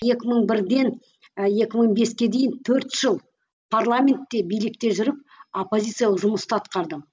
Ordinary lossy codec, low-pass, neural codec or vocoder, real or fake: none; none; none; real